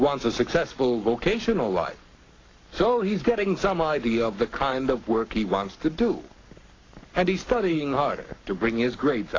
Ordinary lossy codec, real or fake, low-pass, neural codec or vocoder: AAC, 32 kbps; fake; 7.2 kHz; codec, 44.1 kHz, 7.8 kbps, Pupu-Codec